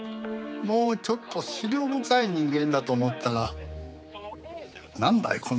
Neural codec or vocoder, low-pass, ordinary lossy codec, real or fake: codec, 16 kHz, 4 kbps, X-Codec, HuBERT features, trained on general audio; none; none; fake